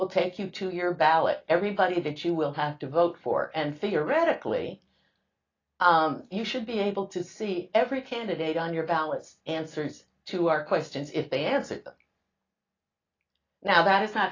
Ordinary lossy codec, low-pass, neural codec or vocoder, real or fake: AAC, 32 kbps; 7.2 kHz; none; real